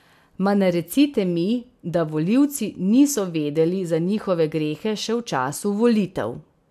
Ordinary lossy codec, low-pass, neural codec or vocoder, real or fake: MP3, 96 kbps; 14.4 kHz; none; real